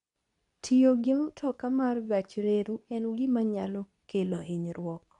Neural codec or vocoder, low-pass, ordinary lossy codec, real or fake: codec, 24 kHz, 0.9 kbps, WavTokenizer, medium speech release version 2; 10.8 kHz; MP3, 96 kbps; fake